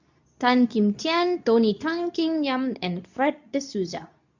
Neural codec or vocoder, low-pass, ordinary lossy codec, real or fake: codec, 24 kHz, 0.9 kbps, WavTokenizer, medium speech release version 2; 7.2 kHz; none; fake